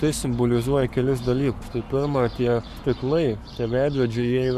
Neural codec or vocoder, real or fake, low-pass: codec, 44.1 kHz, 7.8 kbps, Pupu-Codec; fake; 14.4 kHz